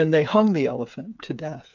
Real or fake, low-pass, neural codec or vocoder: fake; 7.2 kHz; codec, 16 kHz, 8 kbps, FreqCodec, smaller model